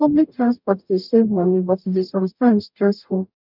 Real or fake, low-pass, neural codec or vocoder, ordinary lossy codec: fake; 5.4 kHz; codec, 44.1 kHz, 0.9 kbps, DAC; none